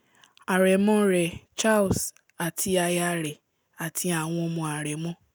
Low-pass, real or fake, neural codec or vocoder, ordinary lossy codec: none; real; none; none